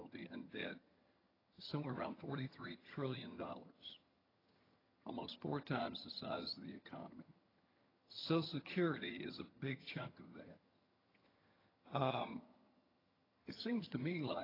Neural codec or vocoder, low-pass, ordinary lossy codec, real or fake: vocoder, 22.05 kHz, 80 mel bands, HiFi-GAN; 5.4 kHz; AAC, 24 kbps; fake